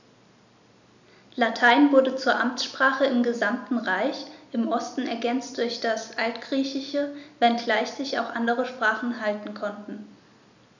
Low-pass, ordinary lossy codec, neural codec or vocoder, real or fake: 7.2 kHz; none; none; real